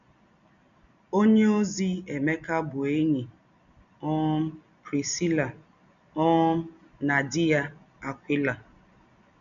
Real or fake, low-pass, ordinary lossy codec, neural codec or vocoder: real; 7.2 kHz; none; none